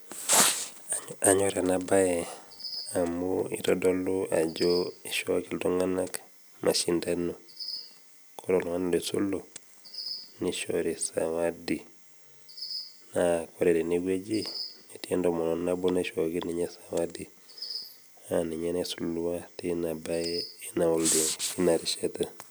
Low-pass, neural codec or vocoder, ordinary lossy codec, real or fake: none; none; none; real